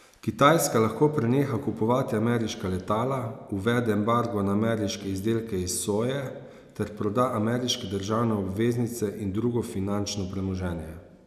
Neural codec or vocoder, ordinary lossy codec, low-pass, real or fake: none; none; 14.4 kHz; real